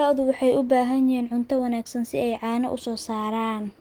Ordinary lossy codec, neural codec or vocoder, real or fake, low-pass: Opus, 24 kbps; none; real; 19.8 kHz